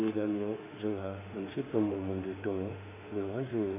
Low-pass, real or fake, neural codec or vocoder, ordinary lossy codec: 3.6 kHz; fake; autoencoder, 48 kHz, 32 numbers a frame, DAC-VAE, trained on Japanese speech; none